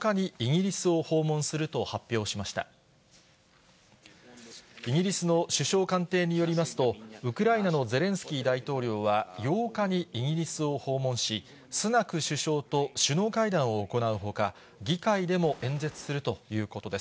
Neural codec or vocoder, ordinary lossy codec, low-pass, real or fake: none; none; none; real